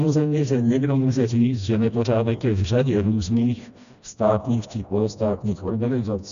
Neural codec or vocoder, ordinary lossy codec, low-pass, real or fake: codec, 16 kHz, 1 kbps, FreqCodec, smaller model; MP3, 96 kbps; 7.2 kHz; fake